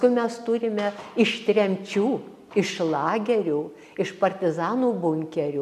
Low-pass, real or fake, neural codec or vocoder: 14.4 kHz; real; none